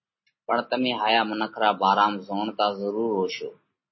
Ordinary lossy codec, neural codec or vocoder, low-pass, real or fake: MP3, 24 kbps; none; 7.2 kHz; real